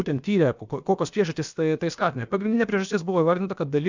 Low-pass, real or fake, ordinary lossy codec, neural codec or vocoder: 7.2 kHz; fake; Opus, 64 kbps; codec, 16 kHz, about 1 kbps, DyCAST, with the encoder's durations